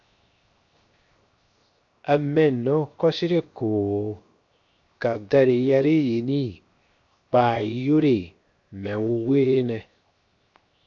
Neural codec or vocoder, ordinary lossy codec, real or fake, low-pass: codec, 16 kHz, 0.7 kbps, FocalCodec; AAC, 64 kbps; fake; 7.2 kHz